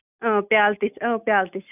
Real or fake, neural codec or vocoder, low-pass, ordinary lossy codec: real; none; 3.6 kHz; none